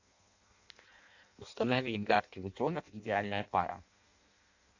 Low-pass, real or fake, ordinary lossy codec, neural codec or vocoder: 7.2 kHz; fake; AAC, 48 kbps; codec, 16 kHz in and 24 kHz out, 0.6 kbps, FireRedTTS-2 codec